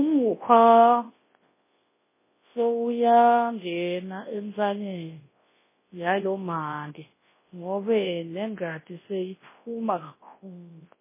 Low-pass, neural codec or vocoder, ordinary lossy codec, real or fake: 3.6 kHz; codec, 24 kHz, 0.9 kbps, WavTokenizer, large speech release; MP3, 16 kbps; fake